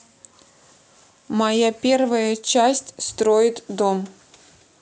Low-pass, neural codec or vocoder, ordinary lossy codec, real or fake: none; none; none; real